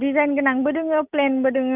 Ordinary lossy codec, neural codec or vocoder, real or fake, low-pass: none; none; real; 3.6 kHz